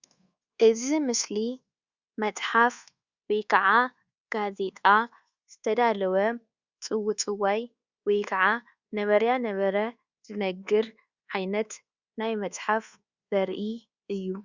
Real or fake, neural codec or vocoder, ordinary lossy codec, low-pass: fake; codec, 24 kHz, 1.2 kbps, DualCodec; Opus, 64 kbps; 7.2 kHz